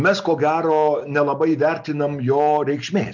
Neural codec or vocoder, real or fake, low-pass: none; real; 7.2 kHz